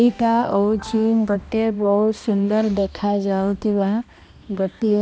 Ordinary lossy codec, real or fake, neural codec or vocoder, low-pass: none; fake; codec, 16 kHz, 1 kbps, X-Codec, HuBERT features, trained on balanced general audio; none